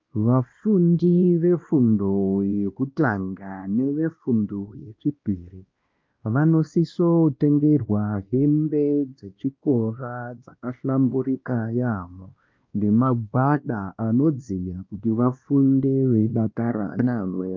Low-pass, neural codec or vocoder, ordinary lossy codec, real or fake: 7.2 kHz; codec, 16 kHz, 1 kbps, X-Codec, WavLM features, trained on Multilingual LibriSpeech; Opus, 24 kbps; fake